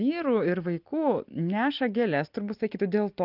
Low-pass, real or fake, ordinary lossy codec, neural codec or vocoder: 5.4 kHz; real; Opus, 24 kbps; none